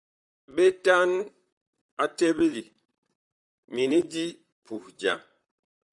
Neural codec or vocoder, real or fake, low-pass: vocoder, 44.1 kHz, 128 mel bands, Pupu-Vocoder; fake; 10.8 kHz